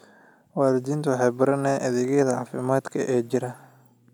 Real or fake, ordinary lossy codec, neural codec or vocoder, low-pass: real; none; none; 19.8 kHz